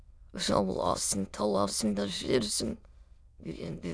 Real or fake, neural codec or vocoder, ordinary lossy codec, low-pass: fake; autoencoder, 22.05 kHz, a latent of 192 numbers a frame, VITS, trained on many speakers; none; none